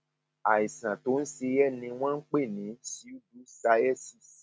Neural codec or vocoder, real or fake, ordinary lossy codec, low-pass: none; real; none; none